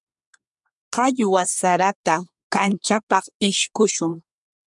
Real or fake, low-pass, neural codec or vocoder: fake; 10.8 kHz; codec, 24 kHz, 1 kbps, SNAC